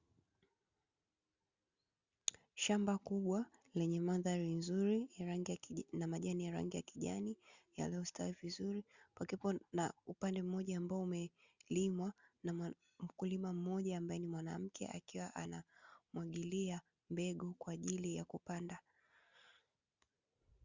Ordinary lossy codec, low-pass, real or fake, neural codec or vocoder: Opus, 64 kbps; 7.2 kHz; real; none